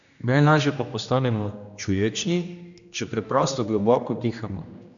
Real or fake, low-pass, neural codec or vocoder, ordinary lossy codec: fake; 7.2 kHz; codec, 16 kHz, 1 kbps, X-Codec, HuBERT features, trained on general audio; none